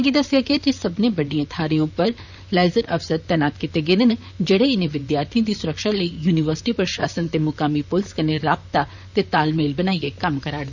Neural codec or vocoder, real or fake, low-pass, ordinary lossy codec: vocoder, 44.1 kHz, 128 mel bands, Pupu-Vocoder; fake; 7.2 kHz; none